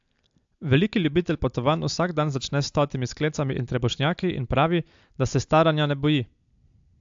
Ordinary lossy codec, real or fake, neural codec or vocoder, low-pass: AAC, 64 kbps; real; none; 7.2 kHz